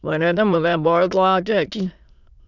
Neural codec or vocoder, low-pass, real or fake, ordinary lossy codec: autoencoder, 22.05 kHz, a latent of 192 numbers a frame, VITS, trained on many speakers; 7.2 kHz; fake; none